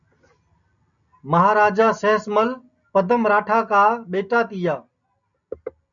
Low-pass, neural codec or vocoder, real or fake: 7.2 kHz; none; real